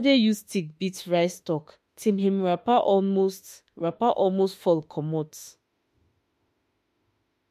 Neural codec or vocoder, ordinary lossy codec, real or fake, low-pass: autoencoder, 48 kHz, 32 numbers a frame, DAC-VAE, trained on Japanese speech; MP3, 64 kbps; fake; 14.4 kHz